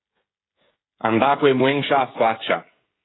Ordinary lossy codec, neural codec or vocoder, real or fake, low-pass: AAC, 16 kbps; codec, 16 kHz, 16 kbps, FreqCodec, smaller model; fake; 7.2 kHz